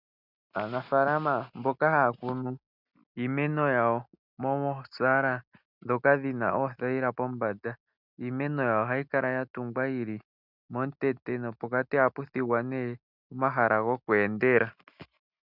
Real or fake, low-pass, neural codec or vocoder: real; 5.4 kHz; none